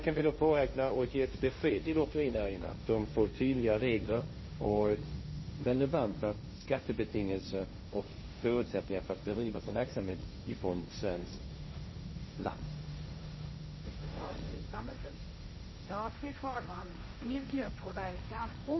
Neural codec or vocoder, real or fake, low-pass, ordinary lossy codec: codec, 16 kHz, 1.1 kbps, Voila-Tokenizer; fake; 7.2 kHz; MP3, 24 kbps